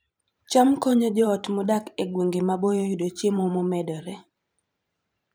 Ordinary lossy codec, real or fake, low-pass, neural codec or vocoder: none; fake; none; vocoder, 44.1 kHz, 128 mel bands every 512 samples, BigVGAN v2